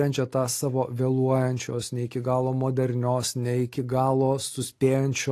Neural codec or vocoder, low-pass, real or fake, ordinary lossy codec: none; 14.4 kHz; real; AAC, 48 kbps